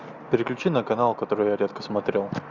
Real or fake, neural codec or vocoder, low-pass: real; none; 7.2 kHz